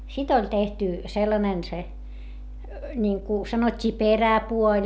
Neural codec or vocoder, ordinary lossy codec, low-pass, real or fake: none; none; none; real